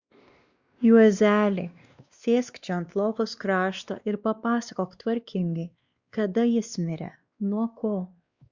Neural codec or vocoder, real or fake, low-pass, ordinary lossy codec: codec, 16 kHz, 2 kbps, X-Codec, WavLM features, trained on Multilingual LibriSpeech; fake; 7.2 kHz; Opus, 64 kbps